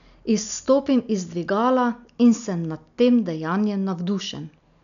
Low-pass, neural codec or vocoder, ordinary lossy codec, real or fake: 7.2 kHz; none; none; real